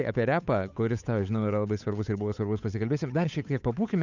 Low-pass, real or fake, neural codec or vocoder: 7.2 kHz; fake; codec, 16 kHz, 8 kbps, FunCodec, trained on Chinese and English, 25 frames a second